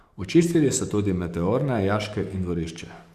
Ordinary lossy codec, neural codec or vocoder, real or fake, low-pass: Opus, 64 kbps; codec, 44.1 kHz, 7.8 kbps, DAC; fake; 14.4 kHz